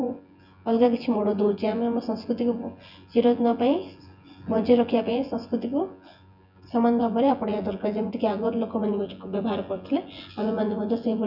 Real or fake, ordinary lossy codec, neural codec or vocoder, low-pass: fake; none; vocoder, 24 kHz, 100 mel bands, Vocos; 5.4 kHz